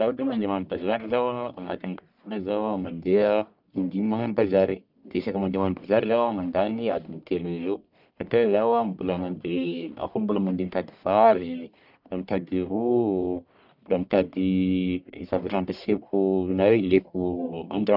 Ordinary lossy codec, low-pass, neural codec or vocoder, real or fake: none; 5.4 kHz; codec, 44.1 kHz, 1.7 kbps, Pupu-Codec; fake